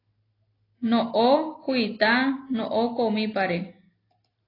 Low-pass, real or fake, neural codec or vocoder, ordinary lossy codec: 5.4 kHz; real; none; AAC, 24 kbps